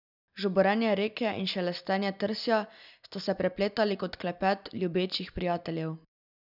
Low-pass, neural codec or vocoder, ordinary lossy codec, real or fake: 5.4 kHz; none; none; real